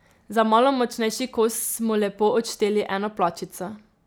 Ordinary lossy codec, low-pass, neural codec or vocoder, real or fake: none; none; none; real